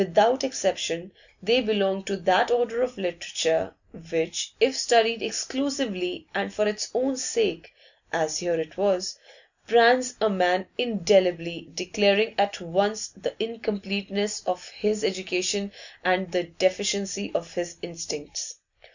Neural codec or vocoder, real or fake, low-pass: none; real; 7.2 kHz